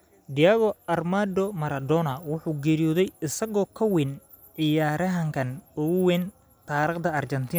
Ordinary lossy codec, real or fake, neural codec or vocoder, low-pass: none; real; none; none